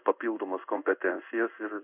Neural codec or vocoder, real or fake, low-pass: codec, 16 kHz in and 24 kHz out, 1 kbps, XY-Tokenizer; fake; 3.6 kHz